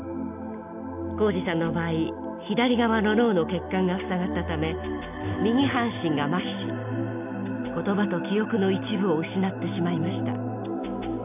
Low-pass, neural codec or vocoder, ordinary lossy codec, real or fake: 3.6 kHz; none; none; real